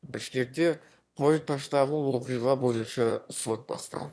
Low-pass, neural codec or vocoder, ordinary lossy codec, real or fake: none; autoencoder, 22.05 kHz, a latent of 192 numbers a frame, VITS, trained on one speaker; none; fake